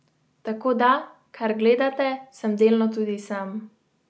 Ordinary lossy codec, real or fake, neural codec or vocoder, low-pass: none; real; none; none